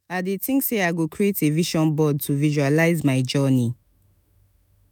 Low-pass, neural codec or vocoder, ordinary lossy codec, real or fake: none; autoencoder, 48 kHz, 128 numbers a frame, DAC-VAE, trained on Japanese speech; none; fake